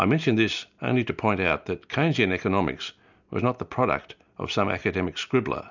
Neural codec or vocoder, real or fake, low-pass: none; real; 7.2 kHz